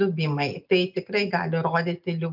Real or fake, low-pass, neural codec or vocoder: real; 5.4 kHz; none